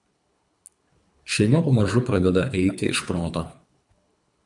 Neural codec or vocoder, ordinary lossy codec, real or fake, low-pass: codec, 24 kHz, 3 kbps, HILCodec; MP3, 96 kbps; fake; 10.8 kHz